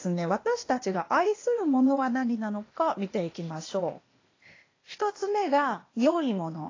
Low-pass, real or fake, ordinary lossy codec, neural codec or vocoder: 7.2 kHz; fake; AAC, 32 kbps; codec, 16 kHz, 0.8 kbps, ZipCodec